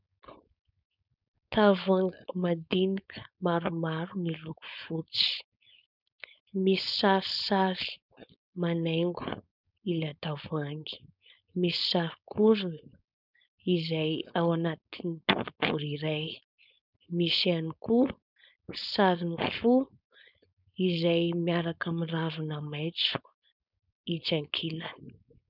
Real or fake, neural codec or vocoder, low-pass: fake; codec, 16 kHz, 4.8 kbps, FACodec; 5.4 kHz